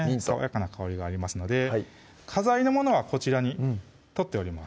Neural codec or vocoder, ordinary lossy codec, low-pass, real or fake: none; none; none; real